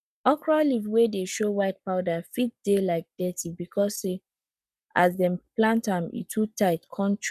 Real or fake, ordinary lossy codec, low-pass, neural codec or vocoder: fake; none; 14.4 kHz; codec, 44.1 kHz, 7.8 kbps, Pupu-Codec